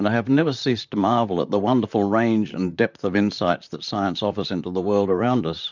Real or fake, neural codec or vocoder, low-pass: fake; vocoder, 44.1 kHz, 128 mel bands, Pupu-Vocoder; 7.2 kHz